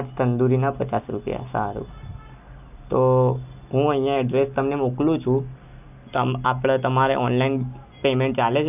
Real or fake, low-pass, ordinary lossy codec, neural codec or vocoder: real; 3.6 kHz; none; none